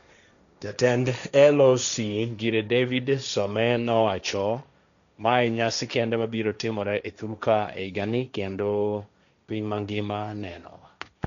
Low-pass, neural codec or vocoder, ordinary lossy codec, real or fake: 7.2 kHz; codec, 16 kHz, 1.1 kbps, Voila-Tokenizer; none; fake